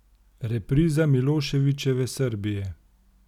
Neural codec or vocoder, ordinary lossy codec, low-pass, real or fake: none; none; 19.8 kHz; real